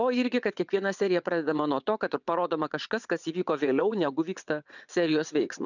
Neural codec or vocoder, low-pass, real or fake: vocoder, 22.05 kHz, 80 mel bands, WaveNeXt; 7.2 kHz; fake